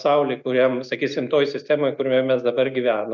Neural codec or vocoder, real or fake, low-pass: none; real; 7.2 kHz